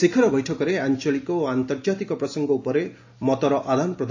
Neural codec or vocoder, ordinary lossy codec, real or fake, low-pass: none; AAC, 48 kbps; real; 7.2 kHz